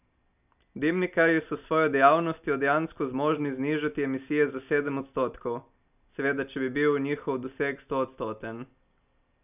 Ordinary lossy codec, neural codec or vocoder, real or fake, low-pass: none; none; real; 3.6 kHz